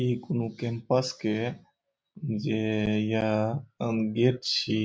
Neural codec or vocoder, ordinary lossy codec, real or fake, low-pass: none; none; real; none